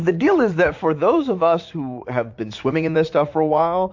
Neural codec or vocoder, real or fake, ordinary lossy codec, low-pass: vocoder, 44.1 kHz, 80 mel bands, Vocos; fake; MP3, 48 kbps; 7.2 kHz